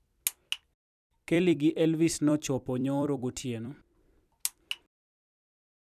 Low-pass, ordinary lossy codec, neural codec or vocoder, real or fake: 14.4 kHz; none; vocoder, 44.1 kHz, 128 mel bands every 256 samples, BigVGAN v2; fake